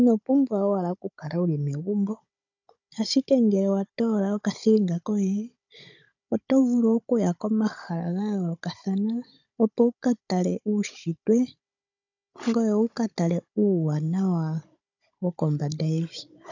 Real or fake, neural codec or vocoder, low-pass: fake; codec, 16 kHz, 16 kbps, FunCodec, trained on Chinese and English, 50 frames a second; 7.2 kHz